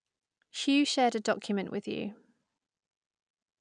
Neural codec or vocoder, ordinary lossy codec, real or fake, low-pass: none; none; real; 9.9 kHz